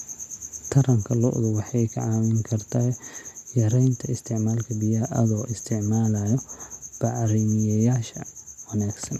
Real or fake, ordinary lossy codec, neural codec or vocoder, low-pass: real; MP3, 96 kbps; none; 14.4 kHz